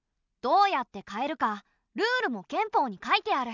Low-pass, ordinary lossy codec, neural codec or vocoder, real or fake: 7.2 kHz; none; none; real